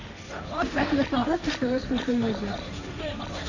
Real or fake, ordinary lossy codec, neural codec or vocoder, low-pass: fake; none; codec, 16 kHz, 1.1 kbps, Voila-Tokenizer; 7.2 kHz